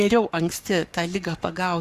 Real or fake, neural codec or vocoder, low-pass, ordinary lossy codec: fake; codec, 44.1 kHz, 7.8 kbps, Pupu-Codec; 14.4 kHz; Opus, 64 kbps